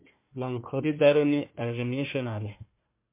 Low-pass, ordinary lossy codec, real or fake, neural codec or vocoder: 3.6 kHz; MP3, 24 kbps; fake; codec, 16 kHz, 1 kbps, FunCodec, trained on Chinese and English, 50 frames a second